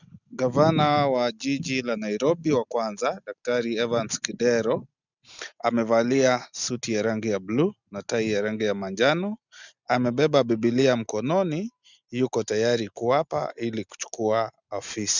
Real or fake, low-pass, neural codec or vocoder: real; 7.2 kHz; none